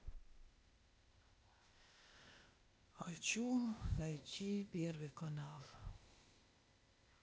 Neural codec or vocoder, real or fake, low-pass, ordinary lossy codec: codec, 16 kHz, 0.8 kbps, ZipCodec; fake; none; none